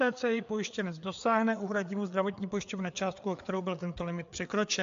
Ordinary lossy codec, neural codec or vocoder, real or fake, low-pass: AAC, 64 kbps; codec, 16 kHz, 4 kbps, FreqCodec, larger model; fake; 7.2 kHz